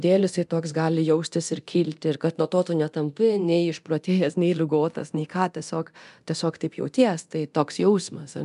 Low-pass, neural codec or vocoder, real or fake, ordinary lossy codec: 10.8 kHz; codec, 24 kHz, 0.9 kbps, DualCodec; fake; MP3, 96 kbps